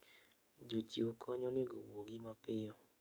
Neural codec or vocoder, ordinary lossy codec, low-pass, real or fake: codec, 44.1 kHz, 7.8 kbps, DAC; none; none; fake